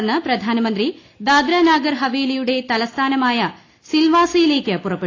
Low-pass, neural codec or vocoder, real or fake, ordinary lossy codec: 7.2 kHz; none; real; AAC, 32 kbps